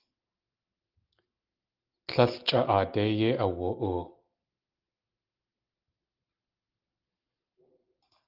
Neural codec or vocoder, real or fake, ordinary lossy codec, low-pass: none; real; Opus, 24 kbps; 5.4 kHz